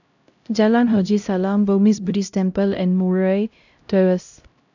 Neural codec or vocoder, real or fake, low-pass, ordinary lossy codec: codec, 16 kHz, 0.5 kbps, X-Codec, HuBERT features, trained on LibriSpeech; fake; 7.2 kHz; none